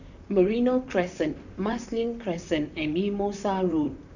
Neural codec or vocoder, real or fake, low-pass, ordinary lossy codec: vocoder, 44.1 kHz, 128 mel bands, Pupu-Vocoder; fake; 7.2 kHz; none